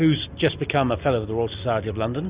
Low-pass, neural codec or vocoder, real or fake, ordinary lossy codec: 3.6 kHz; none; real; Opus, 64 kbps